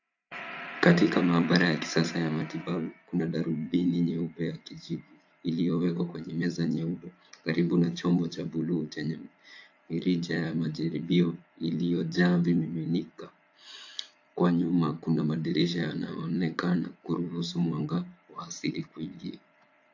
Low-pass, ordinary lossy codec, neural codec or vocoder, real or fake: 7.2 kHz; AAC, 48 kbps; vocoder, 44.1 kHz, 80 mel bands, Vocos; fake